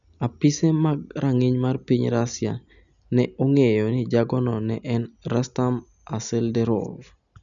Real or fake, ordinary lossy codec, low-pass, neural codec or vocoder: real; none; 7.2 kHz; none